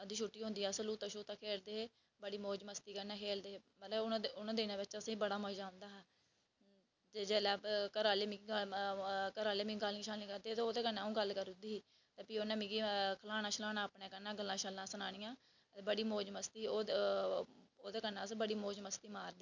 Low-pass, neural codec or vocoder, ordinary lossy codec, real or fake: 7.2 kHz; none; none; real